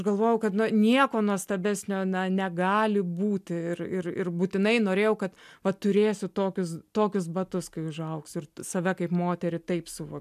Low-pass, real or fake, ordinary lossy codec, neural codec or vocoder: 14.4 kHz; real; MP3, 96 kbps; none